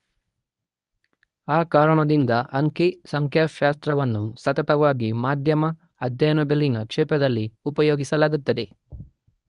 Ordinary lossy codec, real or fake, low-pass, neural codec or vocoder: none; fake; 10.8 kHz; codec, 24 kHz, 0.9 kbps, WavTokenizer, medium speech release version 1